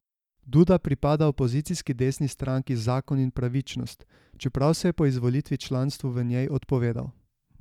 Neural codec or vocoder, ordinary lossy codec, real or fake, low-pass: none; none; real; 19.8 kHz